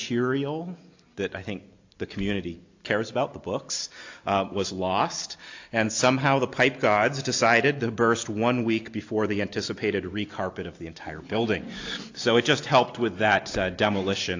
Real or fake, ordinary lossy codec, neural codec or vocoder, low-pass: real; AAC, 48 kbps; none; 7.2 kHz